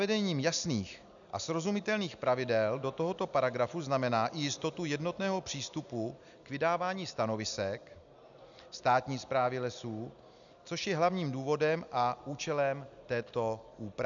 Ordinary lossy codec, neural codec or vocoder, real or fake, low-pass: MP3, 96 kbps; none; real; 7.2 kHz